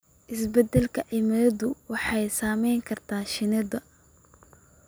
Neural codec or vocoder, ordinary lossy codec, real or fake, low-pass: none; none; real; none